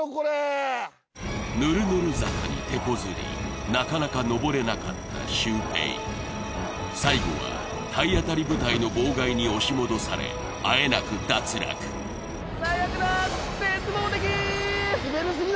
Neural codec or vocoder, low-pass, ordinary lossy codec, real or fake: none; none; none; real